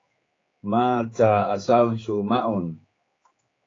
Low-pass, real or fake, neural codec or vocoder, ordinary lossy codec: 7.2 kHz; fake; codec, 16 kHz, 4 kbps, X-Codec, HuBERT features, trained on general audio; AAC, 32 kbps